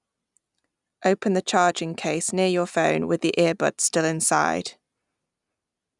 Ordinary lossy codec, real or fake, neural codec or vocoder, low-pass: none; real; none; 10.8 kHz